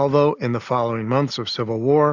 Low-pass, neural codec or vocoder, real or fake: 7.2 kHz; none; real